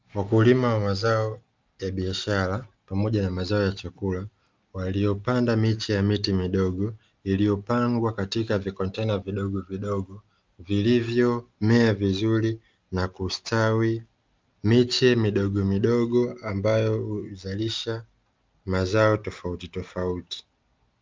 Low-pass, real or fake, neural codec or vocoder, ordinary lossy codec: 7.2 kHz; real; none; Opus, 24 kbps